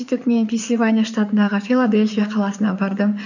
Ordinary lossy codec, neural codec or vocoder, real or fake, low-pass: none; codec, 16 kHz in and 24 kHz out, 2.2 kbps, FireRedTTS-2 codec; fake; 7.2 kHz